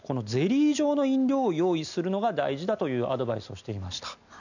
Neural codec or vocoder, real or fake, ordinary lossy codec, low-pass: none; real; none; 7.2 kHz